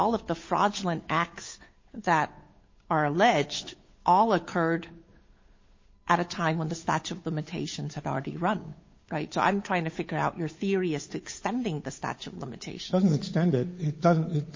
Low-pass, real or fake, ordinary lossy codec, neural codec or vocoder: 7.2 kHz; fake; MP3, 32 kbps; codec, 16 kHz, 8 kbps, FunCodec, trained on Chinese and English, 25 frames a second